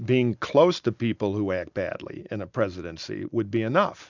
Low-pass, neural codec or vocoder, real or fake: 7.2 kHz; none; real